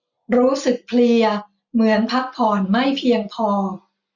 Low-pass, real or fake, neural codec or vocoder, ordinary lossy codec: 7.2 kHz; real; none; none